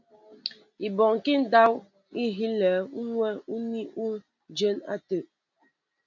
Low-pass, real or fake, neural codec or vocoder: 7.2 kHz; real; none